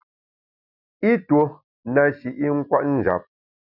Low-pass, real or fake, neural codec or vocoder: 5.4 kHz; real; none